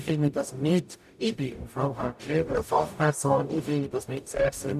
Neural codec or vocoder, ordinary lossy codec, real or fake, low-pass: codec, 44.1 kHz, 0.9 kbps, DAC; none; fake; 14.4 kHz